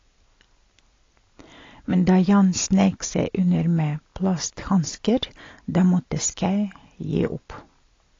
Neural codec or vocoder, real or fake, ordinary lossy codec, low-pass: none; real; AAC, 32 kbps; 7.2 kHz